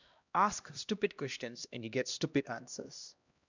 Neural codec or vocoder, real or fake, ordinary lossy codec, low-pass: codec, 16 kHz, 1 kbps, X-Codec, HuBERT features, trained on LibriSpeech; fake; none; 7.2 kHz